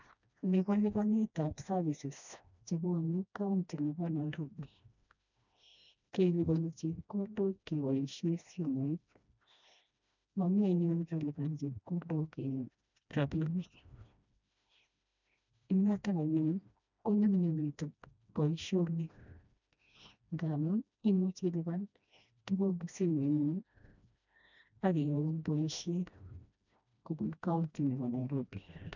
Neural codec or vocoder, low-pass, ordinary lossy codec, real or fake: codec, 16 kHz, 1 kbps, FreqCodec, smaller model; 7.2 kHz; none; fake